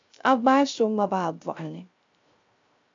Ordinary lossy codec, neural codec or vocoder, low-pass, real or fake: AAC, 64 kbps; codec, 16 kHz, 0.3 kbps, FocalCodec; 7.2 kHz; fake